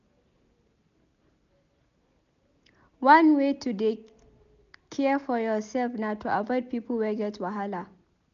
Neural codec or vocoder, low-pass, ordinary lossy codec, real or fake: none; 7.2 kHz; none; real